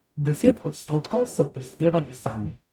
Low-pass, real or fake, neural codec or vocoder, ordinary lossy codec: 19.8 kHz; fake; codec, 44.1 kHz, 0.9 kbps, DAC; none